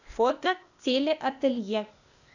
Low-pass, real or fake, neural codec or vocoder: 7.2 kHz; fake; codec, 16 kHz, 0.8 kbps, ZipCodec